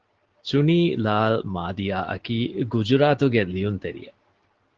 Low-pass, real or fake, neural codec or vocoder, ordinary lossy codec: 7.2 kHz; real; none; Opus, 16 kbps